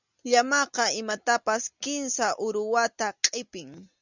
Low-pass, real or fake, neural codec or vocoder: 7.2 kHz; real; none